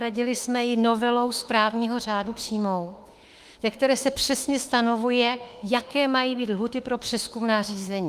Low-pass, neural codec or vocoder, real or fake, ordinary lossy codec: 14.4 kHz; autoencoder, 48 kHz, 32 numbers a frame, DAC-VAE, trained on Japanese speech; fake; Opus, 32 kbps